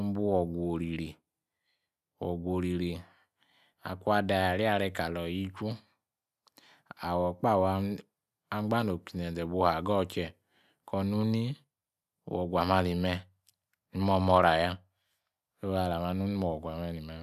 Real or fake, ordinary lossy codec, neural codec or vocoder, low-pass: real; none; none; 14.4 kHz